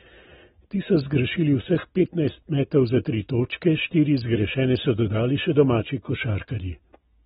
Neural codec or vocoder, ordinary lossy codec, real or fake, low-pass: none; AAC, 16 kbps; real; 19.8 kHz